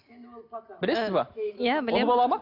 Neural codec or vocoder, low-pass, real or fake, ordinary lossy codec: none; 5.4 kHz; real; none